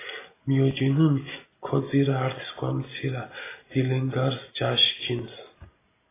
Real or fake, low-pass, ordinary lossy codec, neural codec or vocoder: real; 3.6 kHz; AAC, 16 kbps; none